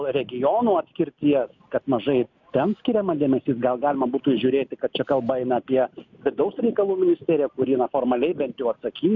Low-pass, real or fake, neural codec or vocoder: 7.2 kHz; real; none